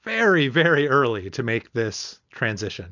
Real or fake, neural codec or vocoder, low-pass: real; none; 7.2 kHz